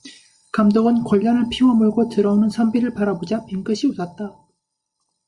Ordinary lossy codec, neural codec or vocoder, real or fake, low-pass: Opus, 64 kbps; none; real; 10.8 kHz